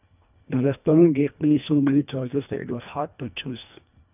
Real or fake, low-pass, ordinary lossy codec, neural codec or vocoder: fake; 3.6 kHz; none; codec, 24 kHz, 1.5 kbps, HILCodec